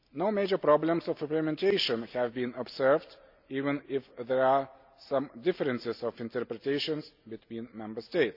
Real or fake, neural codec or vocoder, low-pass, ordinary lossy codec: real; none; 5.4 kHz; none